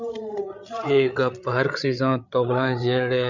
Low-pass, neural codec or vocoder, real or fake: 7.2 kHz; codec, 16 kHz, 8 kbps, FreqCodec, larger model; fake